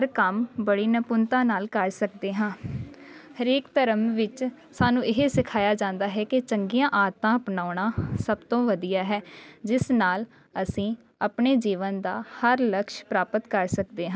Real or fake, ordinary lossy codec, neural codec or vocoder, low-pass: real; none; none; none